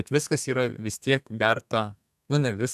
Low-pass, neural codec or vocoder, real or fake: 14.4 kHz; codec, 32 kHz, 1.9 kbps, SNAC; fake